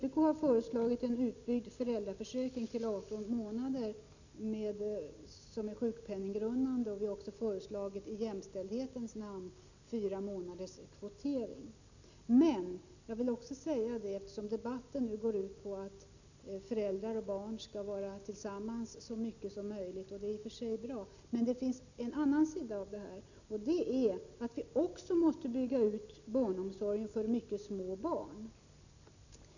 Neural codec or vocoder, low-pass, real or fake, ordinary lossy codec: none; 7.2 kHz; real; none